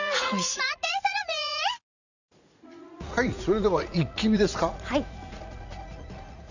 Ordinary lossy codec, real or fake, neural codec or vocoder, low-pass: none; fake; vocoder, 44.1 kHz, 80 mel bands, Vocos; 7.2 kHz